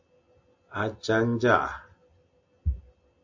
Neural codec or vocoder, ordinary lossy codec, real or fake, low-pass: none; AAC, 48 kbps; real; 7.2 kHz